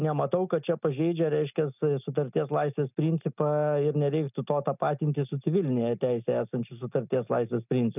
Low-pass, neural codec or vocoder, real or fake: 3.6 kHz; none; real